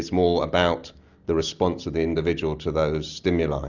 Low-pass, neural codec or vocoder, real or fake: 7.2 kHz; none; real